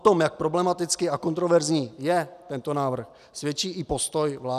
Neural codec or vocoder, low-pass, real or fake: none; 14.4 kHz; real